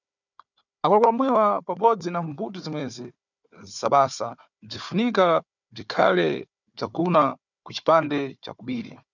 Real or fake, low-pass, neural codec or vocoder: fake; 7.2 kHz; codec, 16 kHz, 4 kbps, FunCodec, trained on Chinese and English, 50 frames a second